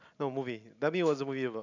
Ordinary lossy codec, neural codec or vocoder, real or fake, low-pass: none; none; real; 7.2 kHz